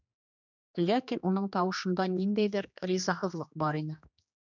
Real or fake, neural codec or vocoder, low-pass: fake; codec, 16 kHz, 2 kbps, X-Codec, HuBERT features, trained on general audio; 7.2 kHz